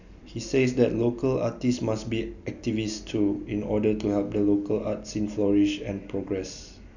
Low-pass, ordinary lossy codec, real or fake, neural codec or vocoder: 7.2 kHz; none; real; none